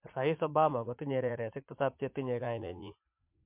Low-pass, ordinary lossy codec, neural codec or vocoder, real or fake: 3.6 kHz; MP3, 32 kbps; vocoder, 44.1 kHz, 80 mel bands, Vocos; fake